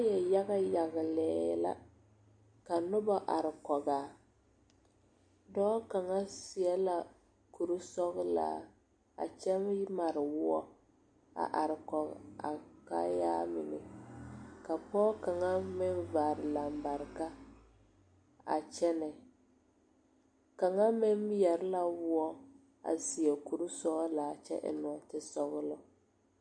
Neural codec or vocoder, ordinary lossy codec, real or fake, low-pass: none; MP3, 48 kbps; real; 9.9 kHz